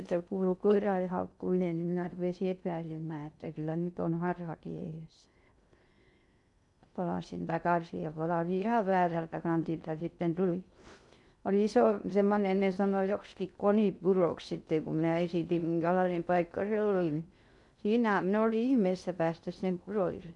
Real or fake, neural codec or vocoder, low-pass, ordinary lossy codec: fake; codec, 16 kHz in and 24 kHz out, 0.6 kbps, FocalCodec, streaming, 2048 codes; 10.8 kHz; none